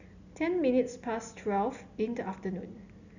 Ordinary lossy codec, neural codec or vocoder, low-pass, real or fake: none; none; 7.2 kHz; real